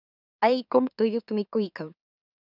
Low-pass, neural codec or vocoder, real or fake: 5.4 kHz; autoencoder, 44.1 kHz, a latent of 192 numbers a frame, MeloTTS; fake